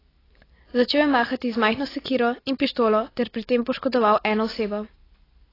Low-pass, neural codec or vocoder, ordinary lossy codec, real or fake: 5.4 kHz; none; AAC, 24 kbps; real